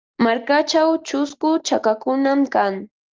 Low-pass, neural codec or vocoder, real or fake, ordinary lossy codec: 7.2 kHz; none; real; Opus, 24 kbps